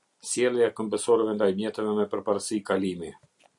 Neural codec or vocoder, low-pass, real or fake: none; 10.8 kHz; real